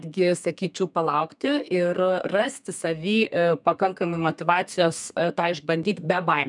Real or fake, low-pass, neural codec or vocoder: fake; 10.8 kHz; codec, 32 kHz, 1.9 kbps, SNAC